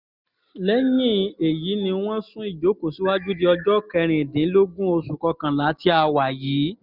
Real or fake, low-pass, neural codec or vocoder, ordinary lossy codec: real; 5.4 kHz; none; none